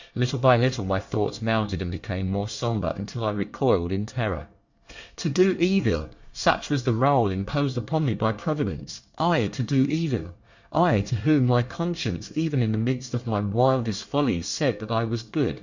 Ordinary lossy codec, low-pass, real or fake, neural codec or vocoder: Opus, 64 kbps; 7.2 kHz; fake; codec, 24 kHz, 1 kbps, SNAC